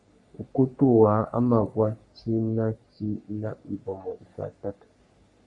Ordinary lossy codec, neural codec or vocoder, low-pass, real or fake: MP3, 48 kbps; codec, 44.1 kHz, 3.4 kbps, Pupu-Codec; 10.8 kHz; fake